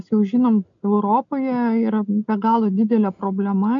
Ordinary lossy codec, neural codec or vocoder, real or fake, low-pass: MP3, 64 kbps; none; real; 7.2 kHz